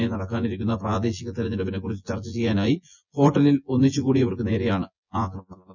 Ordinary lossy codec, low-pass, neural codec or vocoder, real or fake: none; 7.2 kHz; vocoder, 24 kHz, 100 mel bands, Vocos; fake